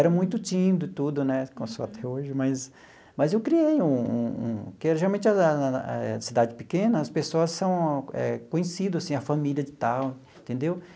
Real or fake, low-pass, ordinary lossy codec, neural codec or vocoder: real; none; none; none